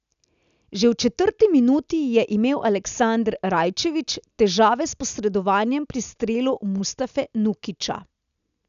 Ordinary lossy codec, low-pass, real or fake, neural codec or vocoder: none; 7.2 kHz; real; none